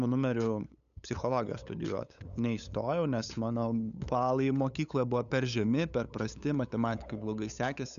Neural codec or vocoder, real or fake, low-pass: codec, 16 kHz, 8 kbps, FunCodec, trained on LibriTTS, 25 frames a second; fake; 7.2 kHz